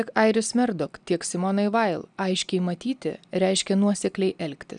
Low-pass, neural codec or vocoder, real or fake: 9.9 kHz; none; real